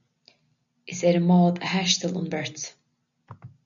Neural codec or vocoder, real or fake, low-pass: none; real; 7.2 kHz